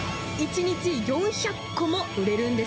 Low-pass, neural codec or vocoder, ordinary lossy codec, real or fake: none; none; none; real